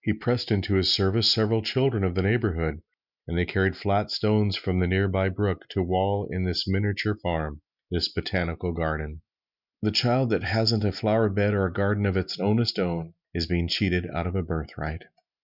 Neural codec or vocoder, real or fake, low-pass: none; real; 5.4 kHz